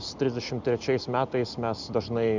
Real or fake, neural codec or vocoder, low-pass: real; none; 7.2 kHz